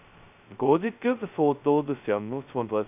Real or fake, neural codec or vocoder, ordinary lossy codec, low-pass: fake; codec, 16 kHz, 0.2 kbps, FocalCodec; none; 3.6 kHz